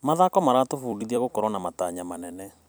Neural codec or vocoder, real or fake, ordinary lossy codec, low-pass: vocoder, 44.1 kHz, 128 mel bands every 256 samples, BigVGAN v2; fake; none; none